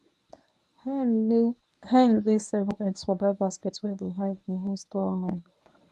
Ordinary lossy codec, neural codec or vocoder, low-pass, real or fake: none; codec, 24 kHz, 0.9 kbps, WavTokenizer, medium speech release version 1; none; fake